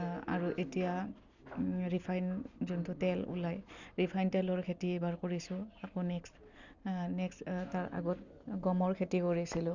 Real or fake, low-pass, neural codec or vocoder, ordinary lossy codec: real; 7.2 kHz; none; none